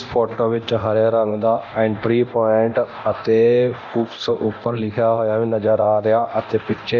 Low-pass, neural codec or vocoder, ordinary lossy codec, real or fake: 7.2 kHz; codec, 24 kHz, 0.9 kbps, DualCodec; none; fake